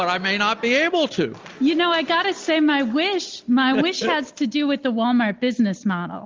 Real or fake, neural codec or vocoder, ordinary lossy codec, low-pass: real; none; Opus, 32 kbps; 7.2 kHz